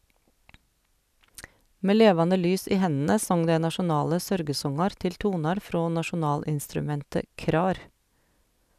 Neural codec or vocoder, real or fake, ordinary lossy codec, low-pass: none; real; none; 14.4 kHz